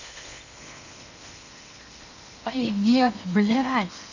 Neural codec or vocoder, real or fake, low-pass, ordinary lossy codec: codec, 16 kHz in and 24 kHz out, 0.8 kbps, FocalCodec, streaming, 65536 codes; fake; 7.2 kHz; none